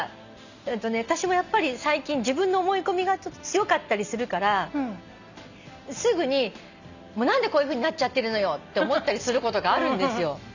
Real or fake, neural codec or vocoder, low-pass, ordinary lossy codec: real; none; 7.2 kHz; none